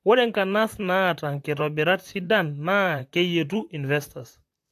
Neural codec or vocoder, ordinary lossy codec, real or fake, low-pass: vocoder, 44.1 kHz, 128 mel bands, Pupu-Vocoder; AAC, 64 kbps; fake; 14.4 kHz